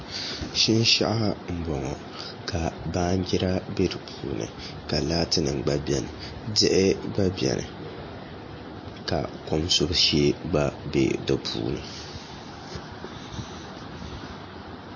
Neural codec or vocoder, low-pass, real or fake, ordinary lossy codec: none; 7.2 kHz; real; MP3, 32 kbps